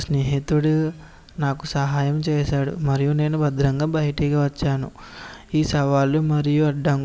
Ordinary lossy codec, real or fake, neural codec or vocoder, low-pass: none; real; none; none